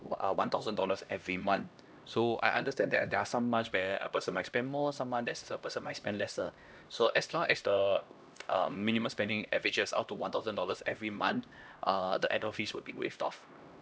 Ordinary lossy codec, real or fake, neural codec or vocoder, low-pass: none; fake; codec, 16 kHz, 1 kbps, X-Codec, HuBERT features, trained on LibriSpeech; none